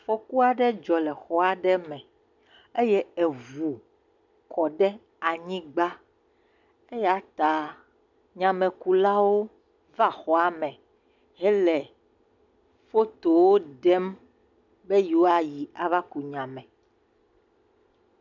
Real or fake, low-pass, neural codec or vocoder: real; 7.2 kHz; none